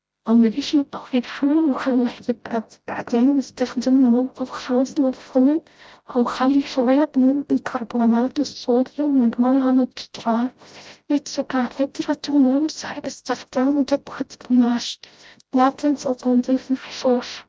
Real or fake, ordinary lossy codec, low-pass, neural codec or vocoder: fake; none; none; codec, 16 kHz, 0.5 kbps, FreqCodec, smaller model